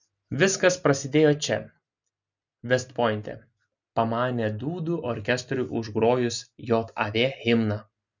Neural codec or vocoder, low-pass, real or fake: none; 7.2 kHz; real